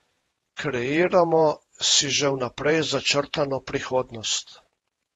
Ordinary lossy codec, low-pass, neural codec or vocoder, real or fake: AAC, 32 kbps; 19.8 kHz; vocoder, 48 kHz, 128 mel bands, Vocos; fake